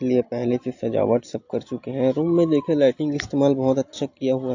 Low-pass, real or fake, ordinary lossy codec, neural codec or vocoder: 7.2 kHz; real; AAC, 48 kbps; none